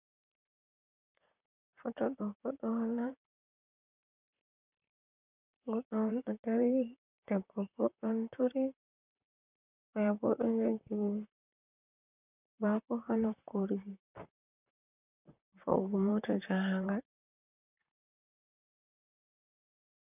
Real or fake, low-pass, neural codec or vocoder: fake; 3.6 kHz; vocoder, 44.1 kHz, 128 mel bands, Pupu-Vocoder